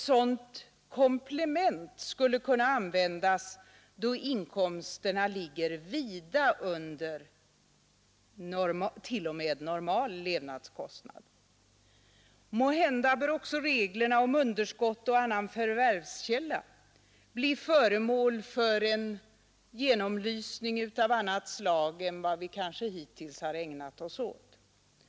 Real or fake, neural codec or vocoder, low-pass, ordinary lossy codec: real; none; none; none